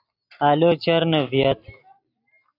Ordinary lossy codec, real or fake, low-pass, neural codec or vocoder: AAC, 32 kbps; real; 5.4 kHz; none